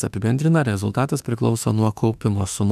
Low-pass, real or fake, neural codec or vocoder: 14.4 kHz; fake; autoencoder, 48 kHz, 32 numbers a frame, DAC-VAE, trained on Japanese speech